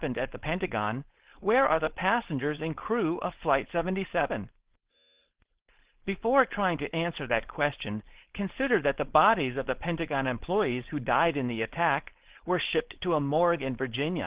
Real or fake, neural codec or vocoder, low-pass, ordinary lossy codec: fake; codec, 16 kHz, 4.8 kbps, FACodec; 3.6 kHz; Opus, 16 kbps